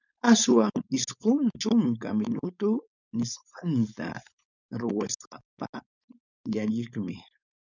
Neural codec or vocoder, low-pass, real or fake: codec, 16 kHz, 4.8 kbps, FACodec; 7.2 kHz; fake